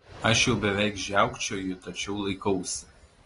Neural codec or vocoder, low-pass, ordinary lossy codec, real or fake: none; 10.8 kHz; AAC, 32 kbps; real